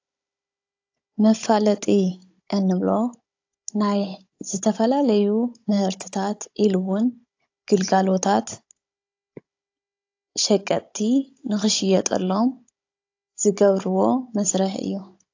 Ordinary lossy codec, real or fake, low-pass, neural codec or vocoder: AAC, 48 kbps; fake; 7.2 kHz; codec, 16 kHz, 16 kbps, FunCodec, trained on Chinese and English, 50 frames a second